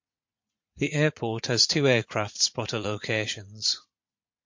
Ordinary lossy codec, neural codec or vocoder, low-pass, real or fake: MP3, 48 kbps; vocoder, 44.1 kHz, 80 mel bands, Vocos; 7.2 kHz; fake